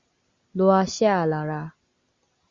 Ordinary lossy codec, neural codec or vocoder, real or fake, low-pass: Opus, 64 kbps; none; real; 7.2 kHz